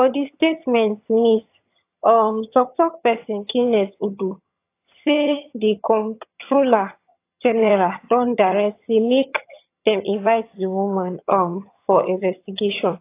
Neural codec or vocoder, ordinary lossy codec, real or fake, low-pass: vocoder, 22.05 kHz, 80 mel bands, HiFi-GAN; AAC, 24 kbps; fake; 3.6 kHz